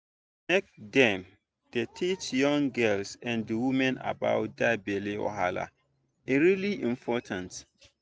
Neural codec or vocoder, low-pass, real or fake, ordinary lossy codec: none; none; real; none